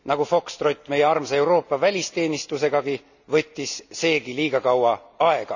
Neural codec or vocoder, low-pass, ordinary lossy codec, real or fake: none; 7.2 kHz; none; real